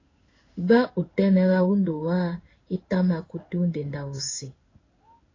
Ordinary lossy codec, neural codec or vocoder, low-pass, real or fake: AAC, 32 kbps; codec, 16 kHz in and 24 kHz out, 1 kbps, XY-Tokenizer; 7.2 kHz; fake